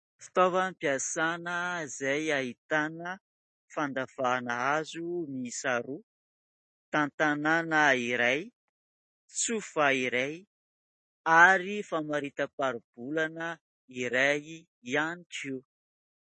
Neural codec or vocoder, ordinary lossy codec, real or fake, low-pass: none; MP3, 32 kbps; real; 9.9 kHz